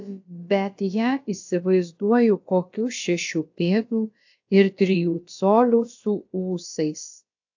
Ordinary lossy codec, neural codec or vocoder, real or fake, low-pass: AAC, 48 kbps; codec, 16 kHz, about 1 kbps, DyCAST, with the encoder's durations; fake; 7.2 kHz